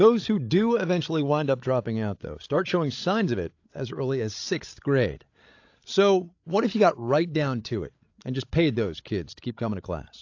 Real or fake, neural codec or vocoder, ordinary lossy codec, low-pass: fake; codec, 16 kHz, 16 kbps, FreqCodec, larger model; AAC, 48 kbps; 7.2 kHz